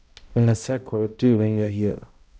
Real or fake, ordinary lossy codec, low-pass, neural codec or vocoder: fake; none; none; codec, 16 kHz, 0.5 kbps, X-Codec, HuBERT features, trained on balanced general audio